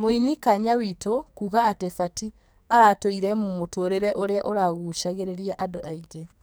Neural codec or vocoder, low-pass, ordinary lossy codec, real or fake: codec, 44.1 kHz, 2.6 kbps, SNAC; none; none; fake